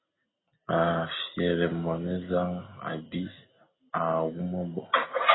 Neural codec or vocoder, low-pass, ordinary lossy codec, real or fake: none; 7.2 kHz; AAC, 16 kbps; real